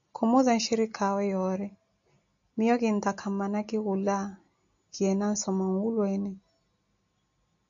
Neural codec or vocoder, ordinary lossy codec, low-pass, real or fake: none; AAC, 64 kbps; 7.2 kHz; real